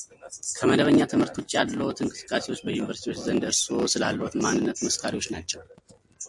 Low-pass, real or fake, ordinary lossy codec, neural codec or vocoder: 10.8 kHz; real; MP3, 64 kbps; none